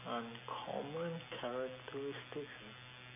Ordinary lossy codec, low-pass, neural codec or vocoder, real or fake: AAC, 32 kbps; 3.6 kHz; none; real